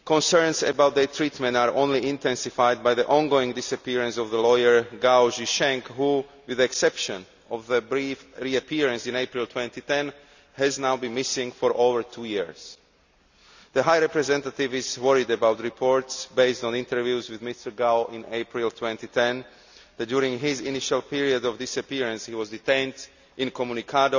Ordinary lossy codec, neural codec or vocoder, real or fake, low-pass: none; none; real; 7.2 kHz